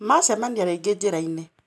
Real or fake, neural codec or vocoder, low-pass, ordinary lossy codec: real; none; none; none